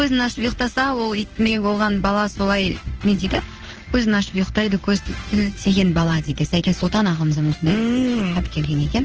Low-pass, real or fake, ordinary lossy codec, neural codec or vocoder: 7.2 kHz; fake; Opus, 16 kbps; codec, 16 kHz in and 24 kHz out, 1 kbps, XY-Tokenizer